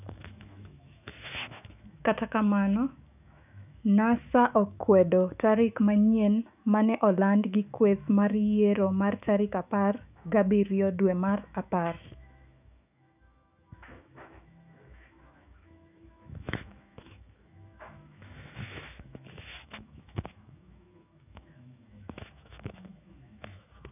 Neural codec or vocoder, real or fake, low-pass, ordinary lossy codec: autoencoder, 48 kHz, 128 numbers a frame, DAC-VAE, trained on Japanese speech; fake; 3.6 kHz; none